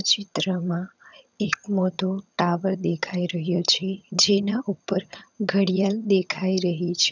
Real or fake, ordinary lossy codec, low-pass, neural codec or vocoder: fake; none; 7.2 kHz; vocoder, 22.05 kHz, 80 mel bands, HiFi-GAN